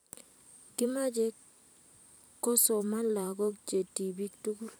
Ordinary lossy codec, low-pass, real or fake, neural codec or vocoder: none; none; fake; vocoder, 44.1 kHz, 128 mel bands every 512 samples, BigVGAN v2